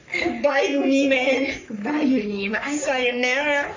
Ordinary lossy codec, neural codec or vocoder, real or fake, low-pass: MP3, 64 kbps; codec, 44.1 kHz, 3.4 kbps, Pupu-Codec; fake; 7.2 kHz